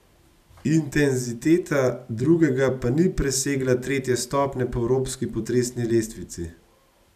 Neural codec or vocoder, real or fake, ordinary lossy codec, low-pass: none; real; none; 14.4 kHz